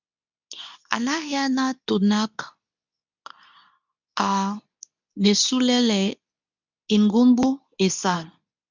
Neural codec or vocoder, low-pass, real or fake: codec, 24 kHz, 0.9 kbps, WavTokenizer, medium speech release version 2; 7.2 kHz; fake